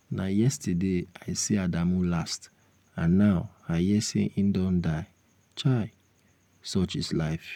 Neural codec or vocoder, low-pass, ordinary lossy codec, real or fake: none; 19.8 kHz; none; real